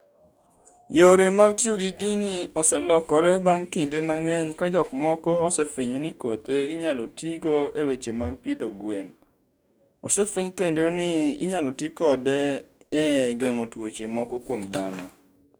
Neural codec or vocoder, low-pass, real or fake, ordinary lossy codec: codec, 44.1 kHz, 2.6 kbps, DAC; none; fake; none